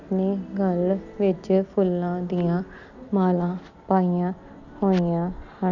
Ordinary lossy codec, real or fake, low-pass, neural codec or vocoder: none; real; 7.2 kHz; none